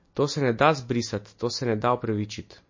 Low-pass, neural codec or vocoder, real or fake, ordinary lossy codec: 7.2 kHz; none; real; MP3, 32 kbps